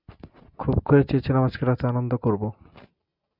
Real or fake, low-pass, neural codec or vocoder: real; 5.4 kHz; none